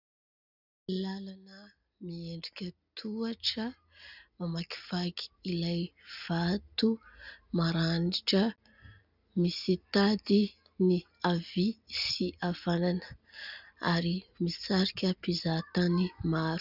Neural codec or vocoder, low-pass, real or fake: none; 5.4 kHz; real